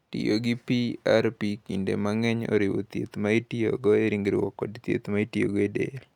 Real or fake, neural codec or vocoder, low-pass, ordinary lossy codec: real; none; 19.8 kHz; none